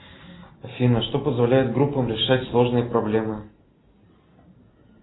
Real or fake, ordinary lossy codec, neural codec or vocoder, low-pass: real; AAC, 16 kbps; none; 7.2 kHz